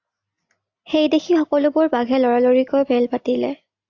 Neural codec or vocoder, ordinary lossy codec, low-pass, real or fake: none; AAC, 48 kbps; 7.2 kHz; real